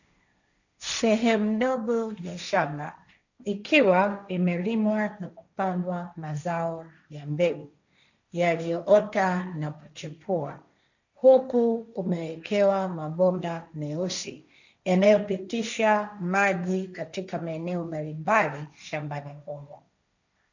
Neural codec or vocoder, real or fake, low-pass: codec, 16 kHz, 1.1 kbps, Voila-Tokenizer; fake; 7.2 kHz